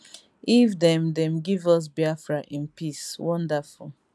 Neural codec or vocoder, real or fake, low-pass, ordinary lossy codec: none; real; none; none